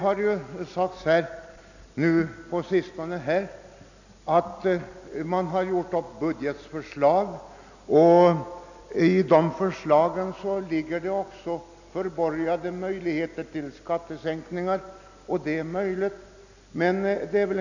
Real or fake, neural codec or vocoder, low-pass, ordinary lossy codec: real; none; 7.2 kHz; none